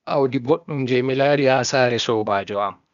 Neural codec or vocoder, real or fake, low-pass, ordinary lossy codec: codec, 16 kHz, 0.8 kbps, ZipCodec; fake; 7.2 kHz; none